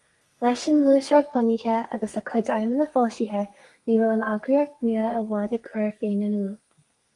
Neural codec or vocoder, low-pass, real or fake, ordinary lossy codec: codec, 32 kHz, 1.9 kbps, SNAC; 10.8 kHz; fake; Opus, 32 kbps